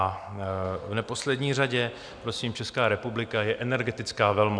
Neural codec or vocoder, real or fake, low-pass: none; real; 9.9 kHz